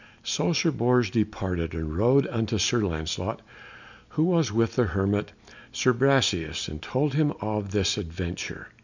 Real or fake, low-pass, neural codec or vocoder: real; 7.2 kHz; none